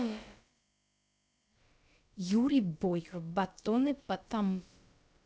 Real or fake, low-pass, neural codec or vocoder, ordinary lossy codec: fake; none; codec, 16 kHz, about 1 kbps, DyCAST, with the encoder's durations; none